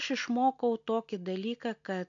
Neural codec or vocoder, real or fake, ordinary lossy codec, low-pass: none; real; MP3, 64 kbps; 7.2 kHz